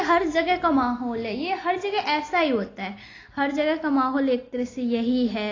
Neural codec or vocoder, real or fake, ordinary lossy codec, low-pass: none; real; AAC, 32 kbps; 7.2 kHz